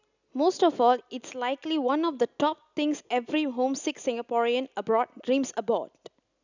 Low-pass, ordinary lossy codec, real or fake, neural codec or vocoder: 7.2 kHz; none; real; none